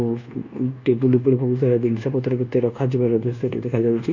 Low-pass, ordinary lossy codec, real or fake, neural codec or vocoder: 7.2 kHz; none; fake; codec, 24 kHz, 1.2 kbps, DualCodec